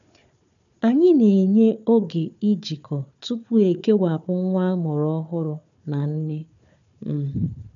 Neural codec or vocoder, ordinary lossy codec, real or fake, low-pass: codec, 16 kHz, 4 kbps, FunCodec, trained on Chinese and English, 50 frames a second; MP3, 96 kbps; fake; 7.2 kHz